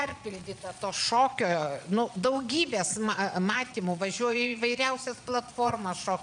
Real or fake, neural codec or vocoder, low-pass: fake; vocoder, 22.05 kHz, 80 mel bands, Vocos; 9.9 kHz